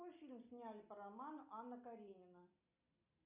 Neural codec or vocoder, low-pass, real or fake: none; 3.6 kHz; real